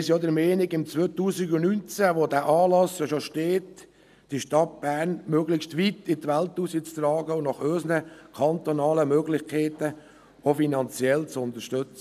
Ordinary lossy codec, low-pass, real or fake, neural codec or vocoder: none; 14.4 kHz; real; none